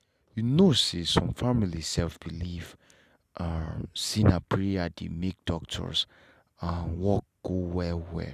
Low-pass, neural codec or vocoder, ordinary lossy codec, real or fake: 14.4 kHz; none; none; real